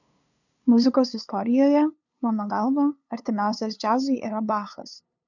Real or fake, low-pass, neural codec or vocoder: fake; 7.2 kHz; codec, 16 kHz, 2 kbps, FunCodec, trained on LibriTTS, 25 frames a second